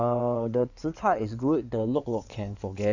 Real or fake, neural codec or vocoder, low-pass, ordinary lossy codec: fake; codec, 16 kHz in and 24 kHz out, 2.2 kbps, FireRedTTS-2 codec; 7.2 kHz; none